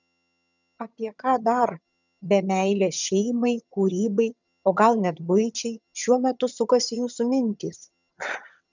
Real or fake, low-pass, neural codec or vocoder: fake; 7.2 kHz; vocoder, 22.05 kHz, 80 mel bands, HiFi-GAN